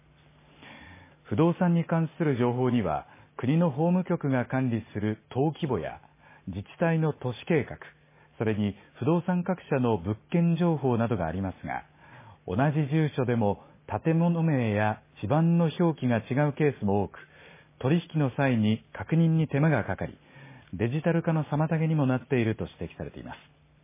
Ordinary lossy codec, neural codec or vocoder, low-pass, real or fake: MP3, 16 kbps; none; 3.6 kHz; real